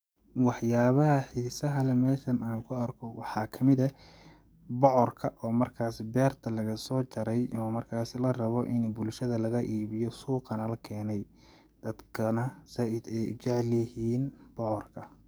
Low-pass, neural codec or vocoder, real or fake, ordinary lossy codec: none; codec, 44.1 kHz, 7.8 kbps, DAC; fake; none